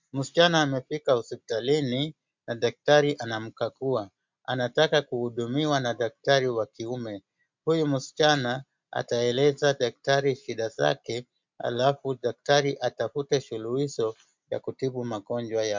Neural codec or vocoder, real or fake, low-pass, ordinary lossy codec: none; real; 7.2 kHz; MP3, 64 kbps